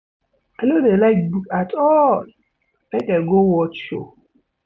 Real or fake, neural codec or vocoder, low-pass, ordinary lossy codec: real; none; none; none